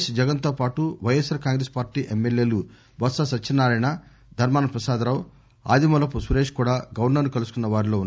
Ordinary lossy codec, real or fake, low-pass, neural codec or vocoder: none; real; none; none